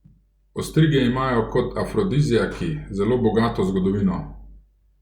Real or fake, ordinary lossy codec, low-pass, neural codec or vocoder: real; Opus, 64 kbps; 19.8 kHz; none